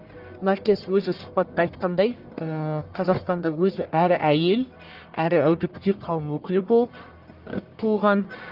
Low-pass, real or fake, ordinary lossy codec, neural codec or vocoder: 5.4 kHz; fake; Opus, 24 kbps; codec, 44.1 kHz, 1.7 kbps, Pupu-Codec